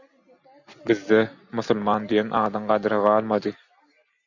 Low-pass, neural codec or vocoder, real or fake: 7.2 kHz; none; real